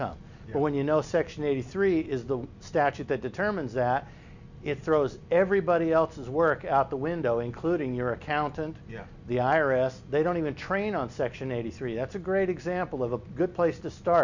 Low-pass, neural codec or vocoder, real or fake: 7.2 kHz; none; real